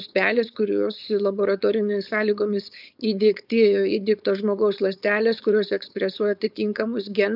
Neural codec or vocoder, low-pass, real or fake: codec, 16 kHz, 4.8 kbps, FACodec; 5.4 kHz; fake